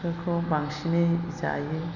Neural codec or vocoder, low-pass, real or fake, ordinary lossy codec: none; 7.2 kHz; real; none